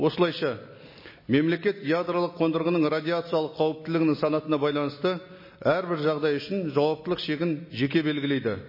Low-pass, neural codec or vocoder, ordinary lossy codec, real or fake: 5.4 kHz; none; MP3, 24 kbps; real